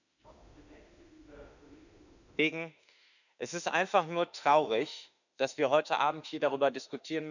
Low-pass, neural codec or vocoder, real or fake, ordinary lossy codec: 7.2 kHz; autoencoder, 48 kHz, 32 numbers a frame, DAC-VAE, trained on Japanese speech; fake; none